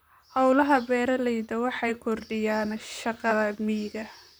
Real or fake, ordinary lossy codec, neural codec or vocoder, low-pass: fake; none; vocoder, 44.1 kHz, 128 mel bands, Pupu-Vocoder; none